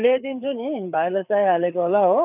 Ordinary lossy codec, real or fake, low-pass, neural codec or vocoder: none; fake; 3.6 kHz; codec, 16 kHz, 4 kbps, FreqCodec, larger model